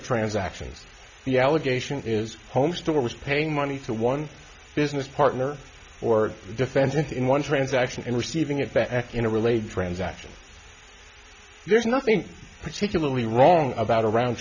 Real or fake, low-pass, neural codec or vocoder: real; 7.2 kHz; none